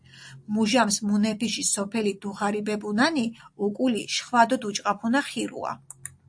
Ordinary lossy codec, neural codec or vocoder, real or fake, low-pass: AAC, 64 kbps; none; real; 9.9 kHz